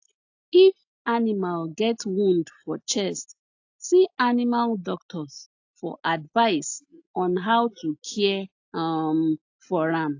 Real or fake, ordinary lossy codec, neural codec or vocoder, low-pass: real; AAC, 48 kbps; none; 7.2 kHz